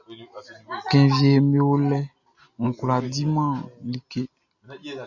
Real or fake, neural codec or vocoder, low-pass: real; none; 7.2 kHz